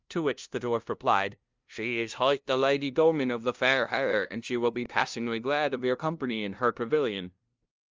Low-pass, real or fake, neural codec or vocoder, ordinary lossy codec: 7.2 kHz; fake; codec, 16 kHz, 0.5 kbps, FunCodec, trained on LibriTTS, 25 frames a second; Opus, 24 kbps